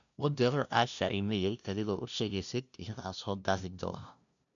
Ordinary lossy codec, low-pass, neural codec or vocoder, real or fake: none; 7.2 kHz; codec, 16 kHz, 0.5 kbps, FunCodec, trained on LibriTTS, 25 frames a second; fake